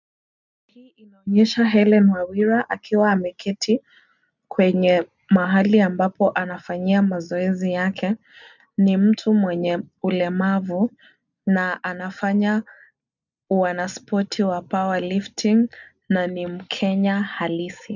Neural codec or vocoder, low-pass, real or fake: none; 7.2 kHz; real